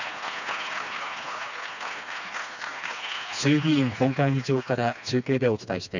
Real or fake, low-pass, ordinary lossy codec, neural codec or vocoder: fake; 7.2 kHz; none; codec, 16 kHz, 2 kbps, FreqCodec, smaller model